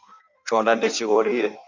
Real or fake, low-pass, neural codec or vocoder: fake; 7.2 kHz; codec, 16 kHz in and 24 kHz out, 1.1 kbps, FireRedTTS-2 codec